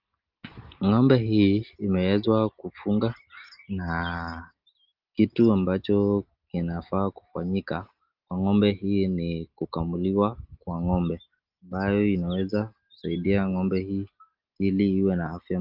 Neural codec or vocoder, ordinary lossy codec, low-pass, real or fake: none; Opus, 32 kbps; 5.4 kHz; real